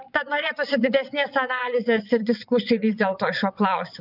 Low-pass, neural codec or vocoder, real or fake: 5.4 kHz; vocoder, 22.05 kHz, 80 mel bands, WaveNeXt; fake